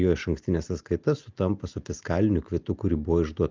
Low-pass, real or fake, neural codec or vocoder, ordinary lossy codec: 7.2 kHz; real; none; Opus, 24 kbps